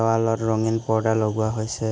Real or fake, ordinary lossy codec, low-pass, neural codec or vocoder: real; none; none; none